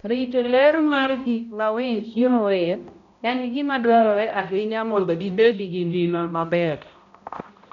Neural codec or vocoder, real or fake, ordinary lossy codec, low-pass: codec, 16 kHz, 0.5 kbps, X-Codec, HuBERT features, trained on balanced general audio; fake; none; 7.2 kHz